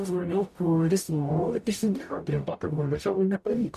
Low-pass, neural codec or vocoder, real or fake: 14.4 kHz; codec, 44.1 kHz, 0.9 kbps, DAC; fake